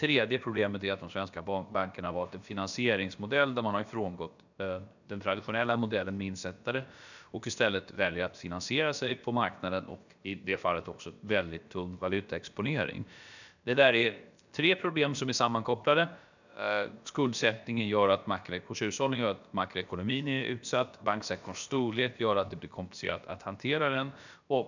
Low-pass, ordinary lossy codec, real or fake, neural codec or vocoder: 7.2 kHz; none; fake; codec, 16 kHz, about 1 kbps, DyCAST, with the encoder's durations